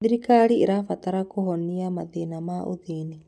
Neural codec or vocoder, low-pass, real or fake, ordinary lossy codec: none; none; real; none